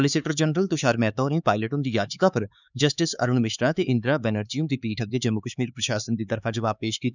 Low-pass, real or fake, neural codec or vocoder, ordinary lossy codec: 7.2 kHz; fake; codec, 16 kHz, 4 kbps, X-Codec, HuBERT features, trained on LibriSpeech; none